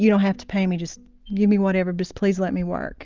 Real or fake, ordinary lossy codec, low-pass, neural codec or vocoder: real; Opus, 24 kbps; 7.2 kHz; none